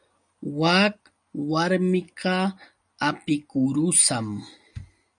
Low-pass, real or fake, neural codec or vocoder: 9.9 kHz; real; none